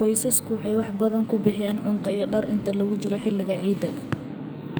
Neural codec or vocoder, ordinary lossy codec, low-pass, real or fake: codec, 44.1 kHz, 2.6 kbps, SNAC; none; none; fake